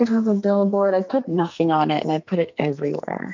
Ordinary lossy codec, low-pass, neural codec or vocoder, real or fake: MP3, 64 kbps; 7.2 kHz; codec, 44.1 kHz, 2.6 kbps, SNAC; fake